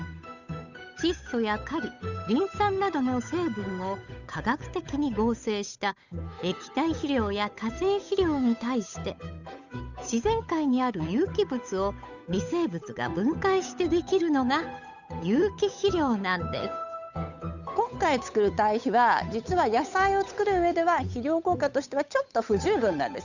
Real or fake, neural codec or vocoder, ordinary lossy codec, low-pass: fake; codec, 16 kHz, 8 kbps, FunCodec, trained on Chinese and English, 25 frames a second; none; 7.2 kHz